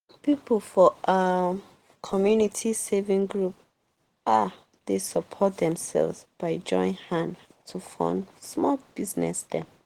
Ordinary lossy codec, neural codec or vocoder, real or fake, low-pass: Opus, 16 kbps; none; real; 19.8 kHz